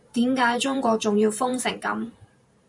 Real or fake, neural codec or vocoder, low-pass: fake; vocoder, 44.1 kHz, 128 mel bands every 512 samples, BigVGAN v2; 10.8 kHz